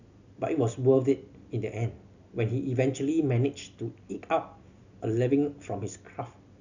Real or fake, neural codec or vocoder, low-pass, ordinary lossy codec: real; none; 7.2 kHz; none